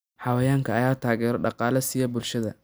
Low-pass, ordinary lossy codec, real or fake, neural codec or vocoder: none; none; real; none